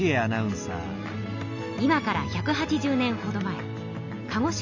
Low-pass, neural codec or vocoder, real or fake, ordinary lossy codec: 7.2 kHz; none; real; none